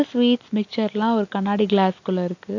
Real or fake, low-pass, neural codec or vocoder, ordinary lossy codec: real; 7.2 kHz; none; AAC, 48 kbps